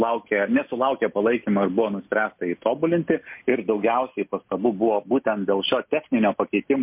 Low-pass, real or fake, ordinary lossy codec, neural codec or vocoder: 3.6 kHz; real; MP3, 32 kbps; none